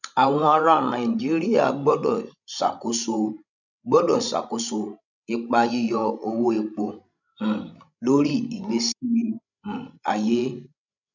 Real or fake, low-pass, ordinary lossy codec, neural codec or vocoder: fake; 7.2 kHz; none; codec, 16 kHz, 16 kbps, FreqCodec, larger model